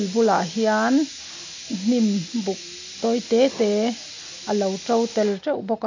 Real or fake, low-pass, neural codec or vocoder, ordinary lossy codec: real; 7.2 kHz; none; AAC, 48 kbps